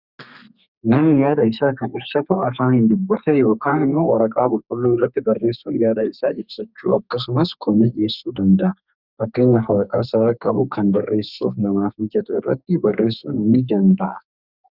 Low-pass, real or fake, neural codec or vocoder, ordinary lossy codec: 5.4 kHz; fake; codec, 32 kHz, 1.9 kbps, SNAC; Opus, 64 kbps